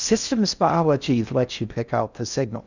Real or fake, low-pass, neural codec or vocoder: fake; 7.2 kHz; codec, 16 kHz in and 24 kHz out, 0.6 kbps, FocalCodec, streaming, 4096 codes